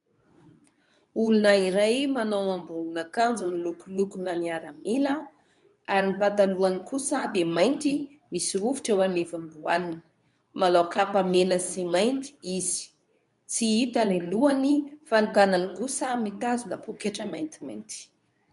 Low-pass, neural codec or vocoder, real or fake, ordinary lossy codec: 10.8 kHz; codec, 24 kHz, 0.9 kbps, WavTokenizer, medium speech release version 2; fake; MP3, 96 kbps